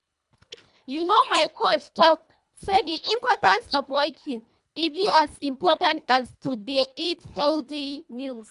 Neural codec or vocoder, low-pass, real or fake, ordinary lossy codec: codec, 24 kHz, 1.5 kbps, HILCodec; 10.8 kHz; fake; none